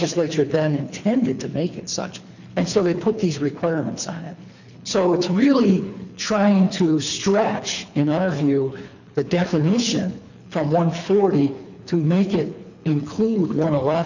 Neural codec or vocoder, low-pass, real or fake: codec, 24 kHz, 3 kbps, HILCodec; 7.2 kHz; fake